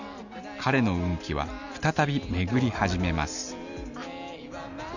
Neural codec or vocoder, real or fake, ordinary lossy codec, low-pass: none; real; none; 7.2 kHz